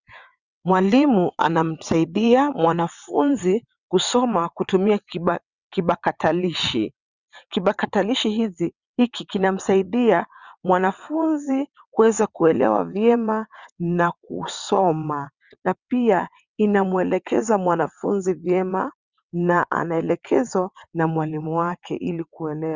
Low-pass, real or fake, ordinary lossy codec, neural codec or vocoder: 7.2 kHz; fake; Opus, 64 kbps; vocoder, 22.05 kHz, 80 mel bands, WaveNeXt